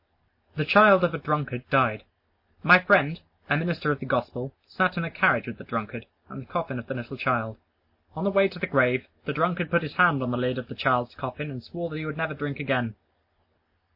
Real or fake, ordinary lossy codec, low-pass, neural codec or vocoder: real; MP3, 32 kbps; 5.4 kHz; none